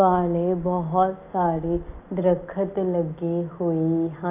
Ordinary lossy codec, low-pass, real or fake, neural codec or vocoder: none; 3.6 kHz; real; none